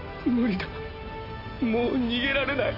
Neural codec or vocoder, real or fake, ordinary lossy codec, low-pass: none; real; AAC, 32 kbps; 5.4 kHz